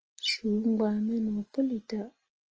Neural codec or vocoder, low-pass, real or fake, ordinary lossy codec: none; 7.2 kHz; real; Opus, 24 kbps